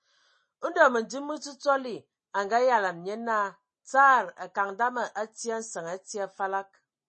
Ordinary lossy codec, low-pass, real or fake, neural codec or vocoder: MP3, 32 kbps; 9.9 kHz; real; none